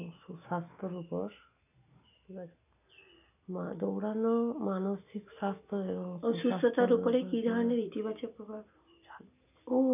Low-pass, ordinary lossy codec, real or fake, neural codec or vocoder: 3.6 kHz; none; real; none